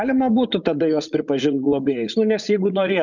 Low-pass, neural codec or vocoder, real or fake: 7.2 kHz; vocoder, 44.1 kHz, 80 mel bands, Vocos; fake